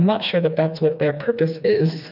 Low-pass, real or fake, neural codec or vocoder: 5.4 kHz; fake; codec, 16 kHz, 2 kbps, FreqCodec, smaller model